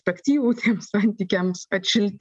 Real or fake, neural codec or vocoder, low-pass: real; none; 10.8 kHz